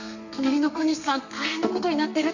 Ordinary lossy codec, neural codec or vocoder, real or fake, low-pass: none; codec, 32 kHz, 1.9 kbps, SNAC; fake; 7.2 kHz